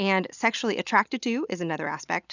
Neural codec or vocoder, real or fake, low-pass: none; real; 7.2 kHz